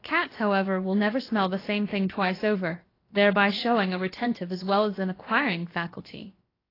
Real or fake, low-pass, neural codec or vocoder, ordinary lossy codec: fake; 5.4 kHz; codec, 16 kHz, about 1 kbps, DyCAST, with the encoder's durations; AAC, 24 kbps